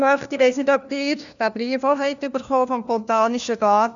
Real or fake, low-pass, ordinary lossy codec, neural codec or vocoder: fake; 7.2 kHz; none; codec, 16 kHz, 1 kbps, FunCodec, trained on LibriTTS, 50 frames a second